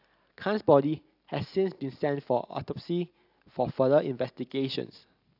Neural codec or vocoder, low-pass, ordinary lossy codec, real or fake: none; 5.4 kHz; AAC, 48 kbps; real